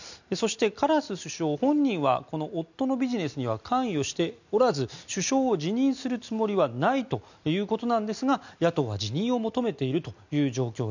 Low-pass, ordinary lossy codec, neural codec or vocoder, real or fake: 7.2 kHz; none; none; real